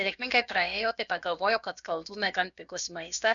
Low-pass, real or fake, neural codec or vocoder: 7.2 kHz; fake; codec, 16 kHz, about 1 kbps, DyCAST, with the encoder's durations